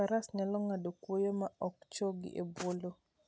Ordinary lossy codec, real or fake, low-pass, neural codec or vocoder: none; real; none; none